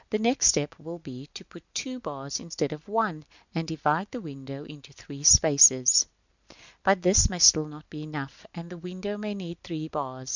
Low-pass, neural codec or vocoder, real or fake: 7.2 kHz; none; real